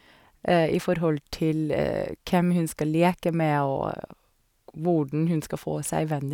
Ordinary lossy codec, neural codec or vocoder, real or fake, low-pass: none; none; real; 19.8 kHz